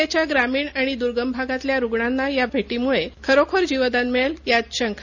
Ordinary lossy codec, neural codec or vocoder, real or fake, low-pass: none; none; real; 7.2 kHz